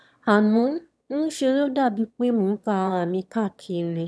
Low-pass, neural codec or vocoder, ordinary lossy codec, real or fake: none; autoencoder, 22.05 kHz, a latent of 192 numbers a frame, VITS, trained on one speaker; none; fake